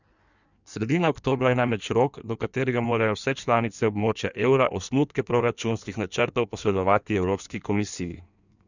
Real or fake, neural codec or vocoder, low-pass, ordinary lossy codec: fake; codec, 16 kHz in and 24 kHz out, 1.1 kbps, FireRedTTS-2 codec; 7.2 kHz; none